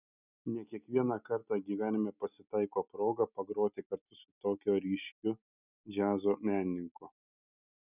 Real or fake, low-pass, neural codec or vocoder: real; 3.6 kHz; none